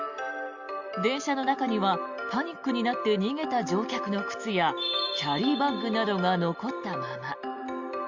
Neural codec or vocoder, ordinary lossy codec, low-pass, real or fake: none; Opus, 64 kbps; 7.2 kHz; real